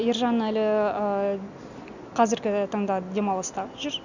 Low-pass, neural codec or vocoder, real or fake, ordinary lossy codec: 7.2 kHz; none; real; none